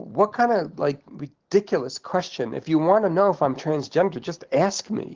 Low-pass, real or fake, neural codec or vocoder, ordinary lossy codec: 7.2 kHz; real; none; Opus, 16 kbps